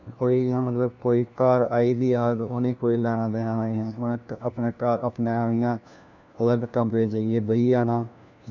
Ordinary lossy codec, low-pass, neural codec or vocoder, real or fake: none; 7.2 kHz; codec, 16 kHz, 1 kbps, FunCodec, trained on LibriTTS, 50 frames a second; fake